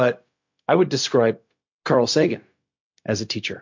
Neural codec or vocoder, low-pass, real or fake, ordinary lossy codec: codec, 16 kHz, 0.4 kbps, LongCat-Audio-Codec; 7.2 kHz; fake; MP3, 48 kbps